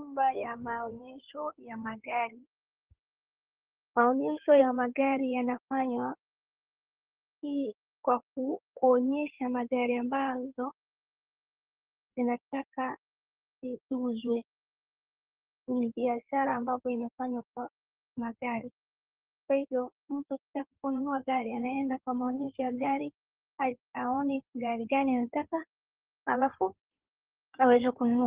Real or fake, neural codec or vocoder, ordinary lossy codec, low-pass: fake; codec, 16 kHz in and 24 kHz out, 2.2 kbps, FireRedTTS-2 codec; Opus, 16 kbps; 3.6 kHz